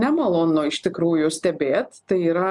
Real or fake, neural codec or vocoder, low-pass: real; none; 10.8 kHz